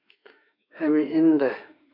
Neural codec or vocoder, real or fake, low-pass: autoencoder, 48 kHz, 32 numbers a frame, DAC-VAE, trained on Japanese speech; fake; 5.4 kHz